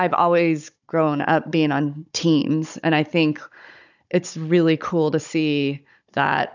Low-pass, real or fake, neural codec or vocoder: 7.2 kHz; fake; codec, 16 kHz, 16 kbps, FunCodec, trained on Chinese and English, 50 frames a second